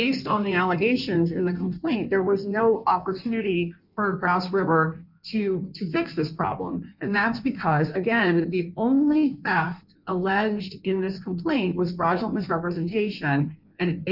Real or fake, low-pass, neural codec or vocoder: fake; 5.4 kHz; codec, 16 kHz in and 24 kHz out, 1.1 kbps, FireRedTTS-2 codec